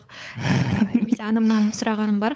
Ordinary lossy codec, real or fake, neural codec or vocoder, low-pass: none; fake; codec, 16 kHz, 8 kbps, FunCodec, trained on LibriTTS, 25 frames a second; none